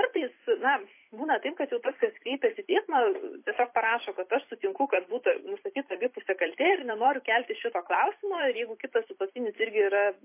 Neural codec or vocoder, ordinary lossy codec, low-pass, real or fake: vocoder, 44.1 kHz, 128 mel bands every 256 samples, BigVGAN v2; MP3, 24 kbps; 3.6 kHz; fake